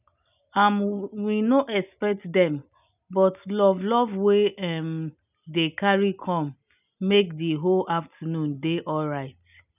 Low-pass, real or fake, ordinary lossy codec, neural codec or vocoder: 3.6 kHz; real; none; none